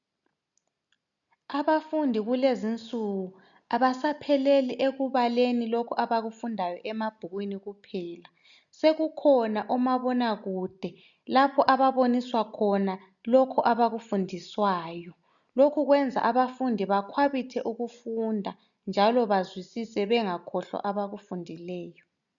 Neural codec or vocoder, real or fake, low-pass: none; real; 7.2 kHz